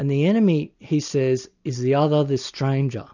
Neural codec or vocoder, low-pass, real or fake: none; 7.2 kHz; real